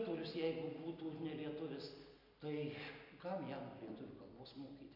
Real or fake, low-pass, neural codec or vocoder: real; 5.4 kHz; none